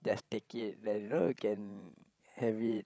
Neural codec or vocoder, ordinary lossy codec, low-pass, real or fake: codec, 16 kHz, 16 kbps, FreqCodec, larger model; none; none; fake